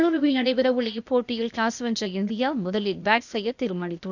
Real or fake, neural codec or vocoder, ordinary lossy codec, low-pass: fake; codec, 16 kHz, 0.8 kbps, ZipCodec; none; 7.2 kHz